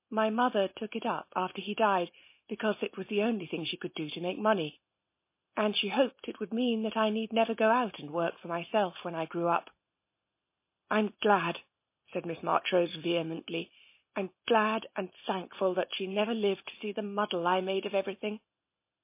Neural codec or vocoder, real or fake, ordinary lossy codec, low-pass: none; real; MP3, 24 kbps; 3.6 kHz